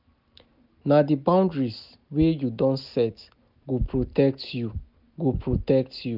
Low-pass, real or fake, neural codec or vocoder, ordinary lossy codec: 5.4 kHz; real; none; none